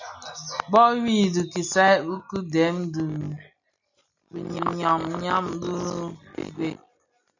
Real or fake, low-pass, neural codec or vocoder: real; 7.2 kHz; none